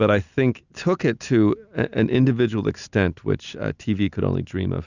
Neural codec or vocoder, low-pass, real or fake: none; 7.2 kHz; real